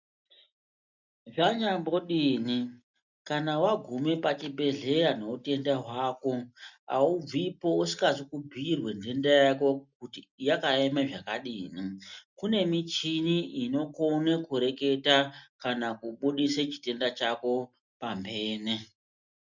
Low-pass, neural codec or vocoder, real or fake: 7.2 kHz; none; real